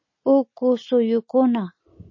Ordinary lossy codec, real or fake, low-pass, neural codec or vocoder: MP3, 32 kbps; real; 7.2 kHz; none